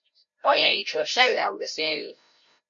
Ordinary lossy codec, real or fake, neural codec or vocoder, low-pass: MP3, 48 kbps; fake; codec, 16 kHz, 0.5 kbps, FreqCodec, larger model; 7.2 kHz